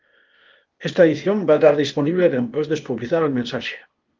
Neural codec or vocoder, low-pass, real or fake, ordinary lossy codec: codec, 16 kHz, 0.8 kbps, ZipCodec; 7.2 kHz; fake; Opus, 24 kbps